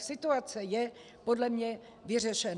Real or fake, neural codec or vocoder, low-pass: real; none; 10.8 kHz